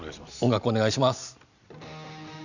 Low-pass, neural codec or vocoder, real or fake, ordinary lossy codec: 7.2 kHz; none; real; none